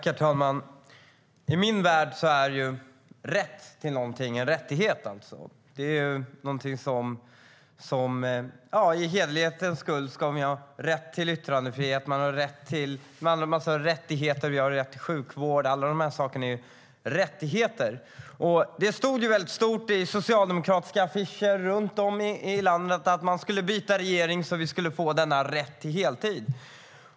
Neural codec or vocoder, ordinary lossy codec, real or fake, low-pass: none; none; real; none